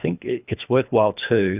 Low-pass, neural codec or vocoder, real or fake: 3.6 kHz; codec, 24 kHz, 3 kbps, HILCodec; fake